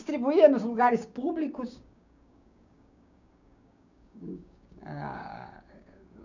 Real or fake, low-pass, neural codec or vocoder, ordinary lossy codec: fake; 7.2 kHz; codec, 16 kHz, 6 kbps, DAC; none